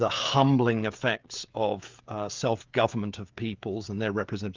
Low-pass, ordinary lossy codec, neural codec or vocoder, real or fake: 7.2 kHz; Opus, 24 kbps; codec, 16 kHz, 16 kbps, FreqCodec, smaller model; fake